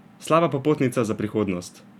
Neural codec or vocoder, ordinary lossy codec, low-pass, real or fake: none; none; 19.8 kHz; real